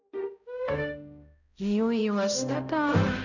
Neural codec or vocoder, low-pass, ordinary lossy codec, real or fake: codec, 16 kHz, 0.5 kbps, X-Codec, HuBERT features, trained on balanced general audio; 7.2 kHz; none; fake